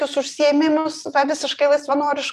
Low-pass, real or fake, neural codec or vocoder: 14.4 kHz; real; none